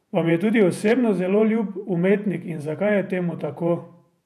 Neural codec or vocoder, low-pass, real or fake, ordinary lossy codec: vocoder, 48 kHz, 128 mel bands, Vocos; 14.4 kHz; fake; none